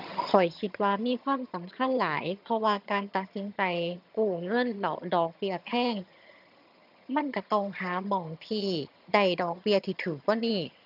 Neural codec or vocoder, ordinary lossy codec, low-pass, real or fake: vocoder, 22.05 kHz, 80 mel bands, HiFi-GAN; none; 5.4 kHz; fake